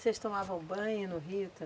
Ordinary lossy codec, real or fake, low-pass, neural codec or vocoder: none; real; none; none